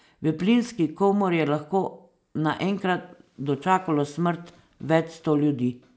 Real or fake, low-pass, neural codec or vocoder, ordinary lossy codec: real; none; none; none